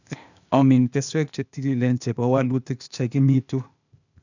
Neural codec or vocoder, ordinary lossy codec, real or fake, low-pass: codec, 16 kHz, 0.8 kbps, ZipCodec; none; fake; 7.2 kHz